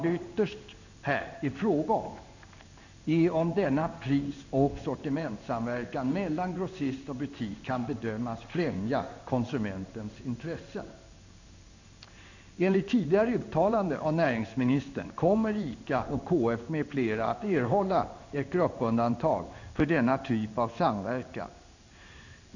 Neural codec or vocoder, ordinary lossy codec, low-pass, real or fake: codec, 16 kHz in and 24 kHz out, 1 kbps, XY-Tokenizer; none; 7.2 kHz; fake